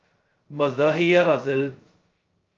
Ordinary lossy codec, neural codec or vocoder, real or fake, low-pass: Opus, 24 kbps; codec, 16 kHz, 0.2 kbps, FocalCodec; fake; 7.2 kHz